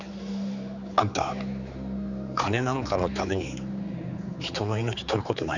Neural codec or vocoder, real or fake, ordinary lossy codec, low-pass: codec, 16 kHz, 4 kbps, X-Codec, HuBERT features, trained on general audio; fake; none; 7.2 kHz